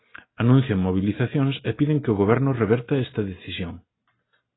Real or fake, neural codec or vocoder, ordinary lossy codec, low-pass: real; none; AAC, 16 kbps; 7.2 kHz